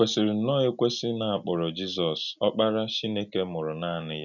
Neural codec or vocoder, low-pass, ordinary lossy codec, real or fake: none; 7.2 kHz; none; real